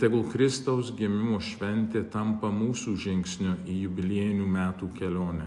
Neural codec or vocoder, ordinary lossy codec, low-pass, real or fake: none; AAC, 64 kbps; 10.8 kHz; real